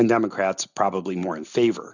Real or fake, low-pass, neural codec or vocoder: real; 7.2 kHz; none